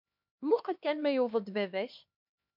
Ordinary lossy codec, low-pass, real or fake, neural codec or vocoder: MP3, 48 kbps; 5.4 kHz; fake; codec, 16 kHz, 2 kbps, X-Codec, HuBERT features, trained on LibriSpeech